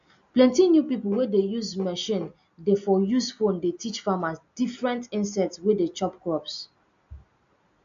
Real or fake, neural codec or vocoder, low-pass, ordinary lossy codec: real; none; 7.2 kHz; AAC, 64 kbps